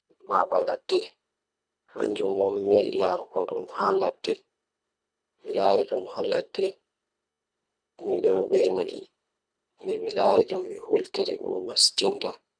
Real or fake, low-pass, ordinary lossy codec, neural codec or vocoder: fake; 9.9 kHz; none; codec, 24 kHz, 1.5 kbps, HILCodec